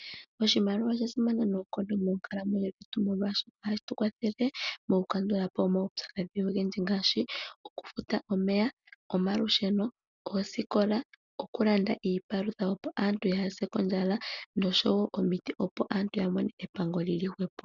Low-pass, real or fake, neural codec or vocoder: 7.2 kHz; real; none